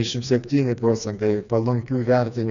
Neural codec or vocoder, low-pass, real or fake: codec, 16 kHz, 2 kbps, FreqCodec, smaller model; 7.2 kHz; fake